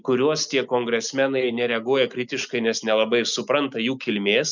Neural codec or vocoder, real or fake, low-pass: none; real; 7.2 kHz